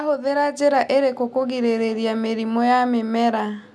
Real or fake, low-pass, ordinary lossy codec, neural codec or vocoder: real; none; none; none